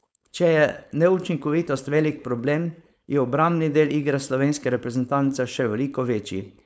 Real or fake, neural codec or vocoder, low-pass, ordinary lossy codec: fake; codec, 16 kHz, 4.8 kbps, FACodec; none; none